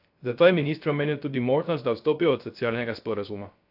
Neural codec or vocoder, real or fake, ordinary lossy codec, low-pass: codec, 16 kHz, 0.3 kbps, FocalCodec; fake; AAC, 48 kbps; 5.4 kHz